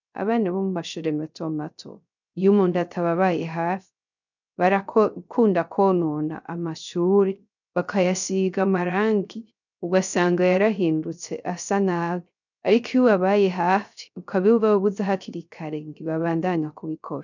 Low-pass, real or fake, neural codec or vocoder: 7.2 kHz; fake; codec, 16 kHz, 0.3 kbps, FocalCodec